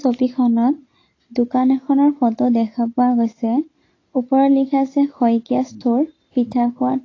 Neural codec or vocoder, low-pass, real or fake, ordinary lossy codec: none; 7.2 kHz; real; AAC, 32 kbps